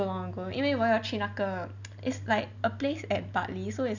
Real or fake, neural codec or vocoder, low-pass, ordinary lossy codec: real; none; 7.2 kHz; none